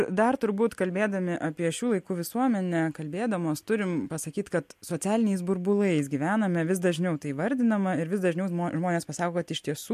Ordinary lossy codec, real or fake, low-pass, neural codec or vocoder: MP3, 64 kbps; real; 14.4 kHz; none